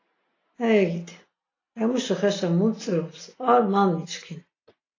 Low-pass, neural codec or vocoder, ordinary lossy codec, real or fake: 7.2 kHz; none; AAC, 32 kbps; real